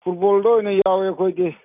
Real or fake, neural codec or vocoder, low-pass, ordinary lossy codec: real; none; 3.6 kHz; none